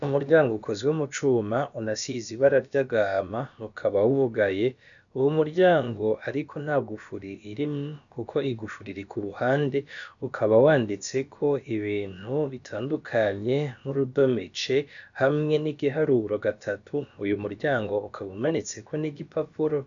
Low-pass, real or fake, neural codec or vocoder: 7.2 kHz; fake; codec, 16 kHz, about 1 kbps, DyCAST, with the encoder's durations